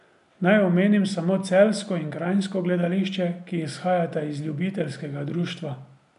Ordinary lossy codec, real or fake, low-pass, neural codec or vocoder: none; real; 10.8 kHz; none